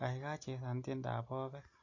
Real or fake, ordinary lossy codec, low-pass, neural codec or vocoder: real; AAC, 48 kbps; 7.2 kHz; none